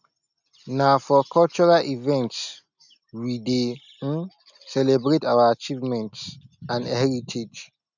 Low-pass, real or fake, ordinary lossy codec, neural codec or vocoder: 7.2 kHz; real; none; none